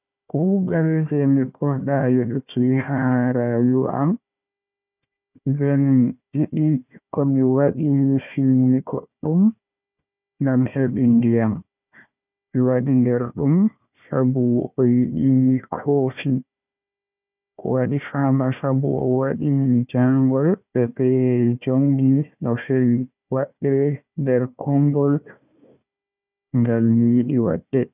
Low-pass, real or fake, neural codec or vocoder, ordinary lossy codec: 3.6 kHz; fake; codec, 16 kHz, 1 kbps, FunCodec, trained on Chinese and English, 50 frames a second; none